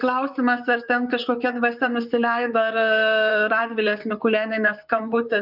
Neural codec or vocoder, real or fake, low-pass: vocoder, 44.1 kHz, 128 mel bands, Pupu-Vocoder; fake; 5.4 kHz